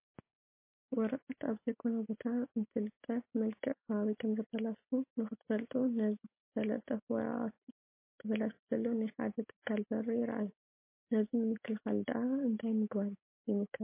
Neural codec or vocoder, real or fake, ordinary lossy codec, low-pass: none; real; MP3, 24 kbps; 3.6 kHz